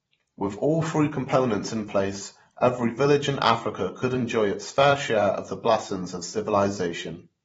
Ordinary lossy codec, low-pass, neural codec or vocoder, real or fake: AAC, 24 kbps; 19.8 kHz; vocoder, 44.1 kHz, 128 mel bands every 512 samples, BigVGAN v2; fake